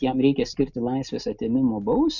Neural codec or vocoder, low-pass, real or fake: none; 7.2 kHz; real